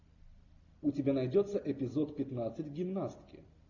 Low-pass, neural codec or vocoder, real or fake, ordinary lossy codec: 7.2 kHz; none; real; AAC, 48 kbps